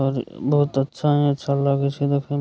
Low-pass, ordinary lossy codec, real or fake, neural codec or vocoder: none; none; real; none